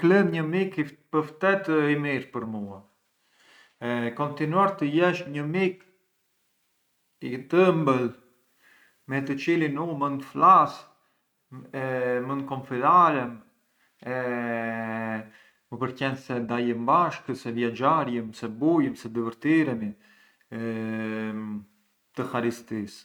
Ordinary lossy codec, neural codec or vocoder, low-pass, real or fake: none; none; 19.8 kHz; real